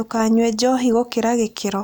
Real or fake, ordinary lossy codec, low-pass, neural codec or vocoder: real; none; none; none